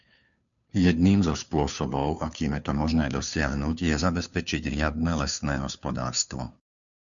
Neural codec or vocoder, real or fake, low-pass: codec, 16 kHz, 2 kbps, FunCodec, trained on Chinese and English, 25 frames a second; fake; 7.2 kHz